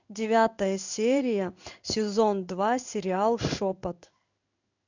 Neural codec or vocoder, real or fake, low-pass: codec, 16 kHz in and 24 kHz out, 1 kbps, XY-Tokenizer; fake; 7.2 kHz